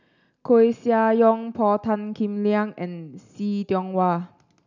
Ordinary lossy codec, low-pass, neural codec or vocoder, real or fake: none; 7.2 kHz; none; real